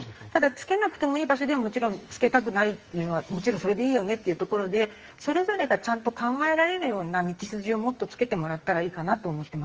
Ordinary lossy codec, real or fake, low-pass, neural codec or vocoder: Opus, 24 kbps; fake; 7.2 kHz; codec, 44.1 kHz, 2.6 kbps, SNAC